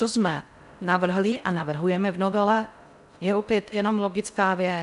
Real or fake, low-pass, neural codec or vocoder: fake; 10.8 kHz; codec, 16 kHz in and 24 kHz out, 0.6 kbps, FocalCodec, streaming, 4096 codes